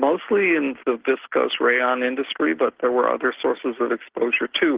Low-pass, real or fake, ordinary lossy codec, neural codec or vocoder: 3.6 kHz; real; Opus, 16 kbps; none